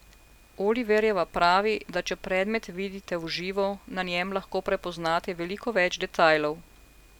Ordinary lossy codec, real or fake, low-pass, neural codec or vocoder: none; real; 19.8 kHz; none